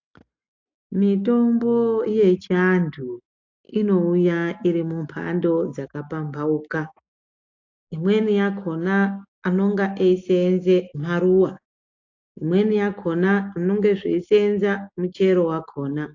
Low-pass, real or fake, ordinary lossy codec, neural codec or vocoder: 7.2 kHz; real; AAC, 48 kbps; none